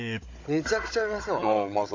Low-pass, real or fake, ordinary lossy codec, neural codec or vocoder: 7.2 kHz; fake; AAC, 48 kbps; codec, 16 kHz, 16 kbps, FunCodec, trained on Chinese and English, 50 frames a second